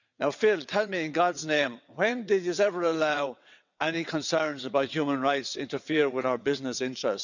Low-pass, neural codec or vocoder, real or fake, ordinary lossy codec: 7.2 kHz; vocoder, 22.05 kHz, 80 mel bands, WaveNeXt; fake; none